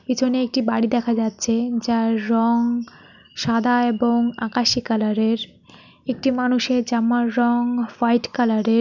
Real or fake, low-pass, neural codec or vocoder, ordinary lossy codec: real; 7.2 kHz; none; none